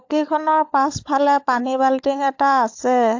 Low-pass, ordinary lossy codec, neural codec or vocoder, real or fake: 7.2 kHz; AAC, 48 kbps; codec, 16 kHz, 16 kbps, FunCodec, trained on LibriTTS, 50 frames a second; fake